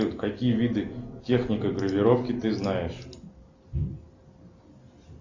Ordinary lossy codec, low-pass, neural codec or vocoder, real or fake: Opus, 64 kbps; 7.2 kHz; none; real